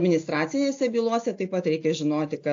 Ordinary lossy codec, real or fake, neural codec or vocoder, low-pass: AAC, 48 kbps; real; none; 7.2 kHz